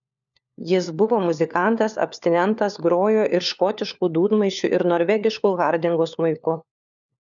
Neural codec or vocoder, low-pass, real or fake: codec, 16 kHz, 4 kbps, FunCodec, trained on LibriTTS, 50 frames a second; 7.2 kHz; fake